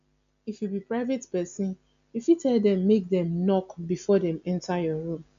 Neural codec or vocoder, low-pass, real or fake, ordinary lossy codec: none; 7.2 kHz; real; AAC, 64 kbps